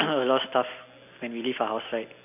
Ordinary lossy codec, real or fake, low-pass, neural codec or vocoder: none; real; 3.6 kHz; none